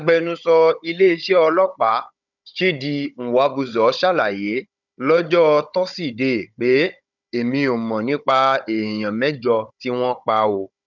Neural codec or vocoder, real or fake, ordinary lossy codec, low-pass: codec, 16 kHz, 16 kbps, FunCodec, trained on Chinese and English, 50 frames a second; fake; none; 7.2 kHz